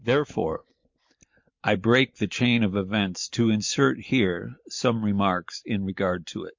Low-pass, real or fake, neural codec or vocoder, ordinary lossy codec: 7.2 kHz; real; none; MP3, 48 kbps